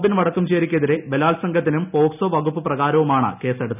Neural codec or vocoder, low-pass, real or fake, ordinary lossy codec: none; 3.6 kHz; real; none